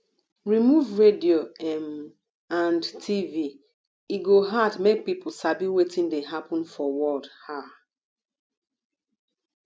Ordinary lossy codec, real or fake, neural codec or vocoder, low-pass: none; real; none; none